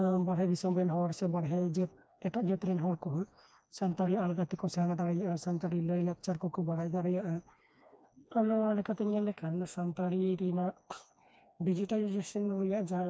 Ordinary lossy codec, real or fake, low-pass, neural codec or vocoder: none; fake; none; codec, 16 kHz, 2 kbps, FreqCodec, smaller model